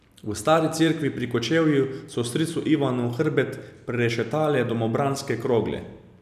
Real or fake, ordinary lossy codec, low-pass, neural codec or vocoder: real; none; 14.4 kHz; none